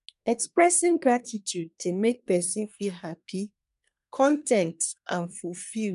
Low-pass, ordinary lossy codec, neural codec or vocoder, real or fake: 10.8 kHz; none; codec, 24 kHz, 1 kbps, SNAC; fake